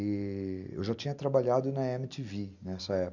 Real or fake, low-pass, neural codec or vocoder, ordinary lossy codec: real; 7.2 kHz; none; none